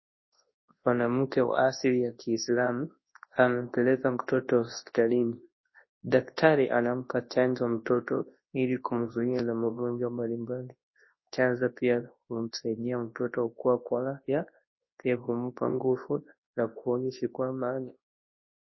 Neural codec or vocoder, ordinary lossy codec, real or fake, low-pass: codec, 24 kHz, 0.9 kbps, WavTokenizer, large speech release; MP3, 24 kbps; fake; 7.2 kHz